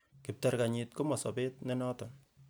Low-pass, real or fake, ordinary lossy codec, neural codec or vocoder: none; real; none; none